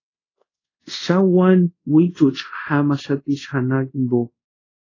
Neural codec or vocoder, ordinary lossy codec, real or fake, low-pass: codec, 24 kHz, 0.5 kbps, DualCodec; AAC, 32 kbps; fake; 7.2 kHz